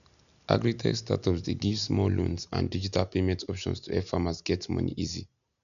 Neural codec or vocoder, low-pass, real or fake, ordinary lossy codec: none; 7.2 kHz; real; none